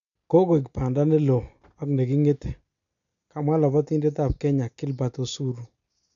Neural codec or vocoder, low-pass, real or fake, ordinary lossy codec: none; 7.2 kHz; real; none